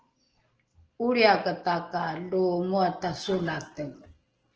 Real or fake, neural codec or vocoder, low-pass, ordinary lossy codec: real; none; 7.2 kHz; Opus, 16 kbps